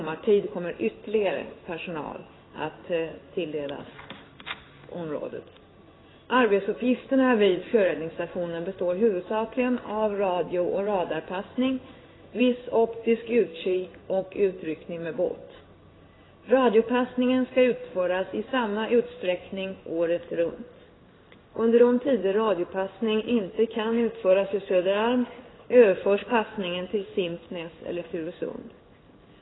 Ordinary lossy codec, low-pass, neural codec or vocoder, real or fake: AAC, 16 kbps; 7.2 kHz; codec, 16 kHz in and 24 kHz out, 1 kbps, XY-Tokenizer; fake